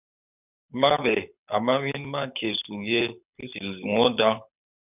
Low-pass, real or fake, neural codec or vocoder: 3.6 kHz; fake; codec, 24 kHz, 6 kbps, HILCodec